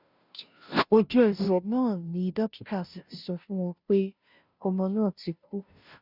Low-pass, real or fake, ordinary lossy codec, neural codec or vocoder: 5.4 kHz; fake; none; codec, 16 kHz, 0.5 kbps, FunCodec, trained on Chinese and English, 25 frames a second